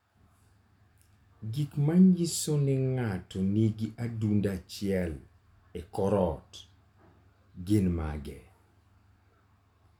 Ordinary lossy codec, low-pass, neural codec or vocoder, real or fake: none; 19.8 kHz; none; real